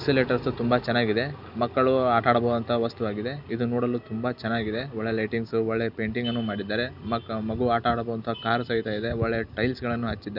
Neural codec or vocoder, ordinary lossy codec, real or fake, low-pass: none; none; real; 5.4 kHz